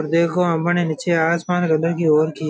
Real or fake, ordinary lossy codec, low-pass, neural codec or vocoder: real; none; none; none